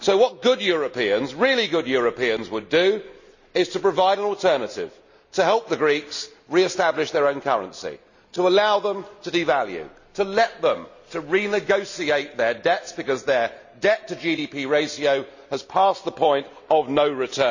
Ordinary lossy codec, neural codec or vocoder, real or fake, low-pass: none; none; real; 7.2 kHz